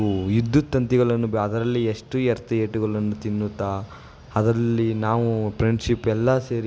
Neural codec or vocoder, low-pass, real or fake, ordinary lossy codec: none; none; real; none